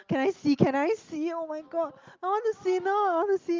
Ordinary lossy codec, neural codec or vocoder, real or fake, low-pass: Opus, 32 kbps; none; real; 7.2 kHz